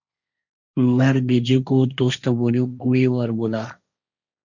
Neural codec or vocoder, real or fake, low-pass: codec, 16 kHz, 1.1 kbps, Voila-Tokenizer; fake; 7.2 kHz